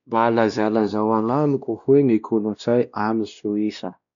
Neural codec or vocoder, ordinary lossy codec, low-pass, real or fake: codec, 16 kHz, 1 kbps, X-Codec, WavLM features, trained on Multilingual LibriSpeech; none; 7.2 kHz; fake